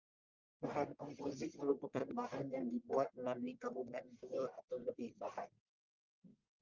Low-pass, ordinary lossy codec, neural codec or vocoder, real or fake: 7.2 kHz; Opus, 24 kbps; codec, 44.1 kHz, 1.7 kbps, Pupu-Codec; fake